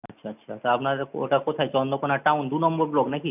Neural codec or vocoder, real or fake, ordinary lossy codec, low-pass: none; real; none; 3.6 kHz